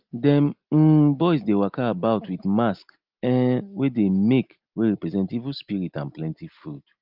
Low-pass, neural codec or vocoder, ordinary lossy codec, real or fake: 5.4 kHz; none; Opus, 32 kbps; real